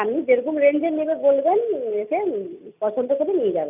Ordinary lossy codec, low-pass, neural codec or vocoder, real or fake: none; 3.6 kHz; none; real